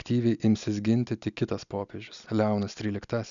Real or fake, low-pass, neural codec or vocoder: real; 7.2 kHz; none